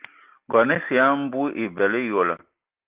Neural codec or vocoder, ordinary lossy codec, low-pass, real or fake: none; Opus, 32 kbps; 3.6 kHz; real